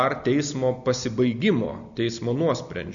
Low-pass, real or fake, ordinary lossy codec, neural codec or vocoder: 7.2 kHz; real; MP3, 96 kbps; none